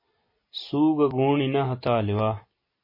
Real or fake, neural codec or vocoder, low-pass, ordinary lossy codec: real; none; 5.4 kHz; MP3, 24 kbps